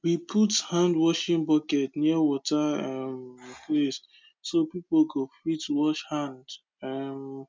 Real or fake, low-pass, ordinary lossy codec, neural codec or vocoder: real; none; none; none